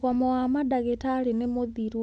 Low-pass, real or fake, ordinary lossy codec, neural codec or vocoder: 9.9 kHz; real; none; none